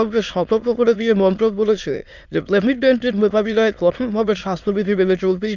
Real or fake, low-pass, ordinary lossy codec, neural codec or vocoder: fake; 7.2 kHz; none; autoencoder, 22.05 kHz, a latent of 192 numbers a frame, VITS, trained on many speakers